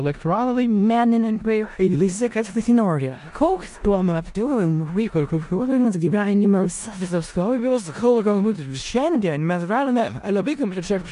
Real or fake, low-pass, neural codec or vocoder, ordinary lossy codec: fake; 10.8 kHz; codec, 16 kHz in and 24 kHz out, 0.4 kbps, LongCat-Audio-Codec, four codebook decoder; AAC, 64 kbps